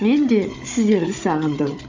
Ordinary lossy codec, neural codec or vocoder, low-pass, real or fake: AAC, 48 kbps; codec, 16 kHz, 16 kbps, FunCodec, trained on Chinese and English, 50 frames a second; 7.2 kHz; fake